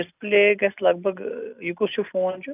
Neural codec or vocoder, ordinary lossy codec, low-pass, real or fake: none; none; 3.6 kHz; real